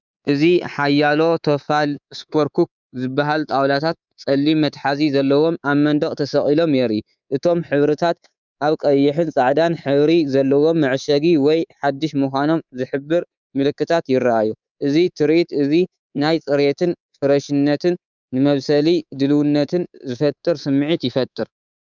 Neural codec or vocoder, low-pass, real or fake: codec, 24 kHz, 3.1 kbps, DualCodec; 7.2 kHz; fake